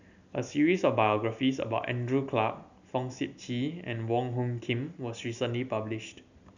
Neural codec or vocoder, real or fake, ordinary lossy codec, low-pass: none; real; none; 7.2 kHz